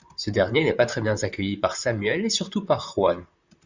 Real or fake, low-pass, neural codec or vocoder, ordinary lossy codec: fake; 7.2 kHz; vocoder, 44.1 kHz, 128 mel bands, Pupu-Vocoder; Opus, 64 kbps